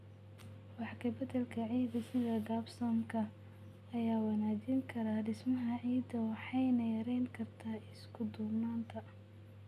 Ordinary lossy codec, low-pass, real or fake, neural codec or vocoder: none; 14.4 kHz; real; none